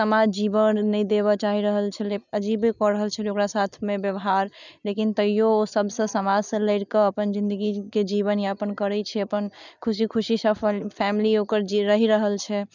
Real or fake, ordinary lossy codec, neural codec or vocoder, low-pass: real; none; none; 7.2 kHz